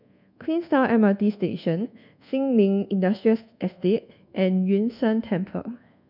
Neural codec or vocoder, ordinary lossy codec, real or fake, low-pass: codec, 24 kHz, 1.2 kbps, DualCodec; none; fake; 5.4 kHz